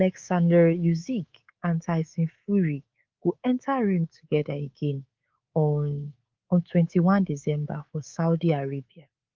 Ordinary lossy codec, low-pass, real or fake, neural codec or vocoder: Opus, 32 kbps; 7.2 kHz; fake; codec, 24 kHz, 3.1 kbps, DualCodec